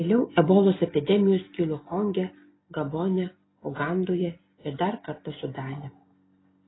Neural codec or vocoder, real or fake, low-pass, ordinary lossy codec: none; real; 7.2 kHz; AAC, 16 kbps